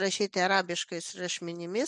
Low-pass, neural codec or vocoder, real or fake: 10.8 kHz; none; real